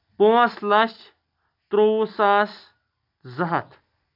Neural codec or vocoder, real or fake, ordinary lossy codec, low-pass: none; real; none; 5.4 kHz